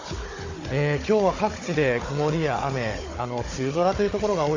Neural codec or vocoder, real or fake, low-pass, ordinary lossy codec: codec, 16 kHz, 16 kbps, FunCodec, trained on Chinese and English, 50 frames a second; fake; 7.2 kHz; AAC, 32 kbps